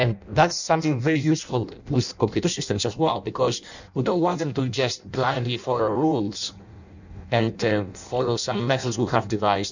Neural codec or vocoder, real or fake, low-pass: codec, 16 kHz in and 24 kHz out, 0.6 kbps, FireRedTTS-2 codec; fake; 7.2 kHz